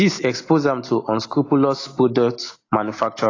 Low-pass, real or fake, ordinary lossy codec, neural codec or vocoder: 7.2 kHz; real; AAC, 32 kbps; none